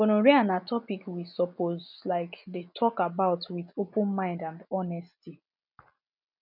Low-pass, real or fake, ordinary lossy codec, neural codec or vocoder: 5.4 kHz; real; none; none